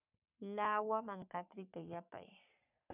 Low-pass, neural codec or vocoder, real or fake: 3.6 kHz; codec, 44.1 kHz, 3.4 kbps, Pupu-Codec; fake